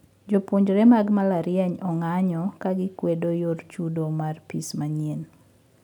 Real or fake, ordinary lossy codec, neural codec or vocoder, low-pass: real; none; none; 19.8 kHz